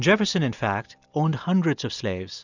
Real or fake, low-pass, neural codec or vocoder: real; 7.2 kHz; none